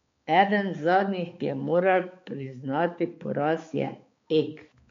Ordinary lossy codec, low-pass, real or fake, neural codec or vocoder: MP3, 48 kbps; 7.2 kHz; fake; codec, 16 kHz, 4 kbps, X-Codec, HuBERT features, trained on balanced general audio